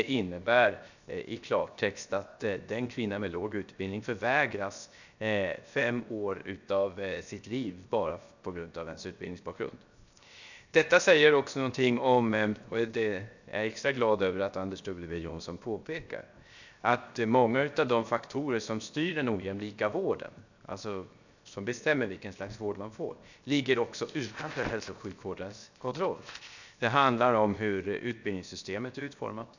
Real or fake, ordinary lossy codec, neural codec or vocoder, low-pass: fake; none; codec, 16 kHz, 0.7 kbps, FocalCodec; 7.2 kHz